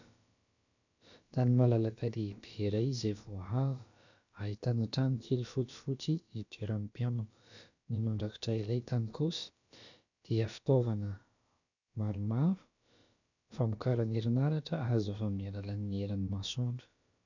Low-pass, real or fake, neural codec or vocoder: 7.2 kHz; fake; codec, 16 kHz, about 1 kbps, DyCAST, with the encoder's durations